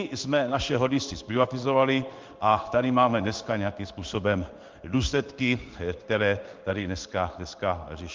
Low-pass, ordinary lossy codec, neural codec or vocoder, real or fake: 7.2 kHz; Opus, 24 kbps; codec, 16 kHz in and 24 kHz out, 1 kbps, XY-Tokenizer; fake